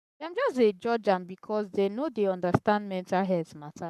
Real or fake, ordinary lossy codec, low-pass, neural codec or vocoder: fake; none; 14.4 kHz; codec, 44.1 kHz, 7.8 kbps, Pupu-Codec